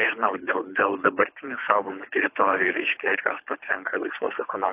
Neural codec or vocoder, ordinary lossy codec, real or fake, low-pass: codec, 24 kHz, 3 kbps, HILCodec; AAC, 24 kbps; fake; 3.6 kHz